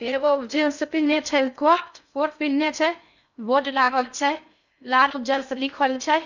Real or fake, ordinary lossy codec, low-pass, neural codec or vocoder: fake; none; 7.2 kHz; codec, 16 kHz in and 24 kHz out, 0.6 kbps, FocalCodec, streaming, 2048 codes